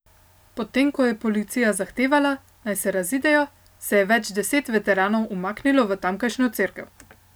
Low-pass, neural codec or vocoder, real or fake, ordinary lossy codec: none; none; real; none